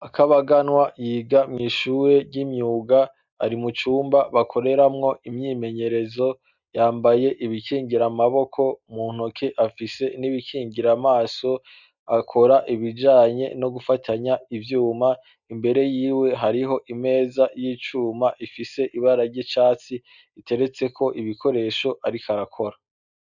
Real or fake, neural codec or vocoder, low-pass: real; none; 7.2 kHz